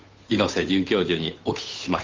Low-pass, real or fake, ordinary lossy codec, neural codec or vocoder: 7.2 kHz; fake; Opus, 32 kbps; codec, 16 kHz, 8 kbps, FunCodec, trained on Chinese and English, 25 frames a second